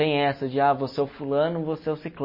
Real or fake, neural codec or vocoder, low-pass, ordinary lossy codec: real; none; 5.4 kHz; MP3, 24 kbps